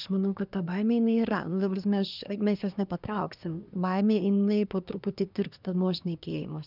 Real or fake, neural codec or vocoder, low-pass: fake; codec, 24 kHz, 1 kbps, SNAC; 5.4 kHz